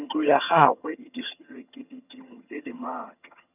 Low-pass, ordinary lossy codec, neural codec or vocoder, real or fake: 3.6 kHz; none; vocoder, 22.05 kHz, 80 mel bands, HiFi-GAN; fake